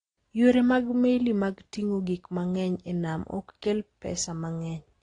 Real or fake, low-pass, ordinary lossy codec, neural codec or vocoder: real; 9.9 kHz; AAC, 32 kbps; none